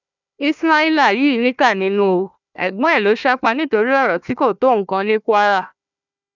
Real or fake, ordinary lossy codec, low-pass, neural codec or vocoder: fake; none; 7.2 kHz; codec, 16 kHz, 1 kbps, FunCodec, trained on Chinese and English, 50 frames a second